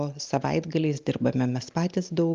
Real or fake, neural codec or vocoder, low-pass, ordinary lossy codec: real; none; 7.2 kHz; Opus, 24 kbps